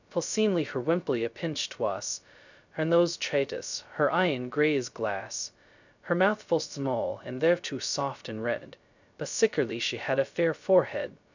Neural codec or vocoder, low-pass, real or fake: codec, 16 kHz, 0.2 kbps, FocalCodec; 7.2 kHz; fake